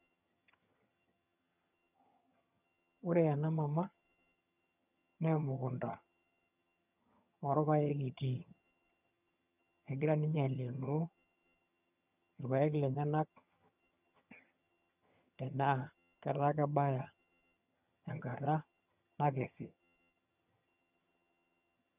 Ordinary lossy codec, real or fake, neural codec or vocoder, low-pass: none; fake; vocoder, 22.05 kHz, 80 mel bands, HiFi-GAN; 3.6 kHz